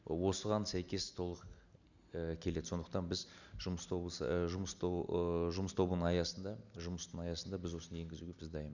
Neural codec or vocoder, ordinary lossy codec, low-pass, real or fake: none; none; 7.2 kHz; real